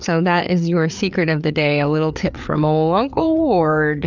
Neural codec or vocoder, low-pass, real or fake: codec, 16 kHz, 4 kbps, FreqCodec, larger model; 7.2 kHz; fake